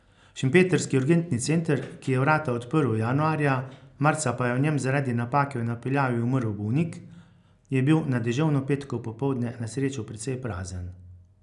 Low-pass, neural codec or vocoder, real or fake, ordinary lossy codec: 10.8 kHz; none; real; none